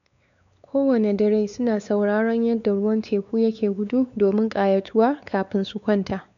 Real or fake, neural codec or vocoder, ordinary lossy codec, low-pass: fake; codec, 16 kHz, 4 kbps, X-Codec, WavLM features, trained on Multilingual LibriSpeech; none; 7.2 kHz